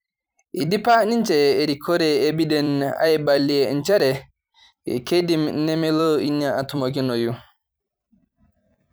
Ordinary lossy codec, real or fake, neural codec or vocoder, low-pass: none; fake; vocoder, 44.1 kHz, 128 mel bands every 256 samples, BigVGAN v2; none